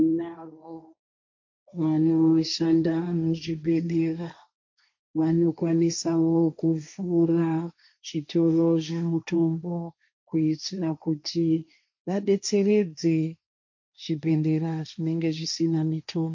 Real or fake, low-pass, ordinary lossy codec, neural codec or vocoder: fake; 7.2 kHz; MP3, 48 kbps; codec, 16 kHz, 1.1 kbps, Voila-Tokenizer